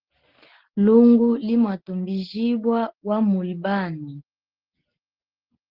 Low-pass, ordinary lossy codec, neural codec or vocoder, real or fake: 5.4 kHz; Opus, 16 kbps; none; real